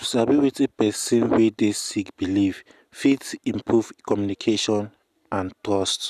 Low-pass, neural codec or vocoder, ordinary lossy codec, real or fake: 14.4 kHz; none; none; real